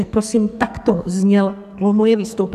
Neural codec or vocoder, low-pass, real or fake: codec, 44.1 kHz, 2.6 kbps, SNAC; 14.4 kHz; fake